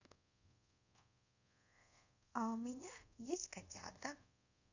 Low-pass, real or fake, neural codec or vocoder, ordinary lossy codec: 7.2 kHz; fake; codec, 24 kHz, 0.5 kbps, DualCodec; none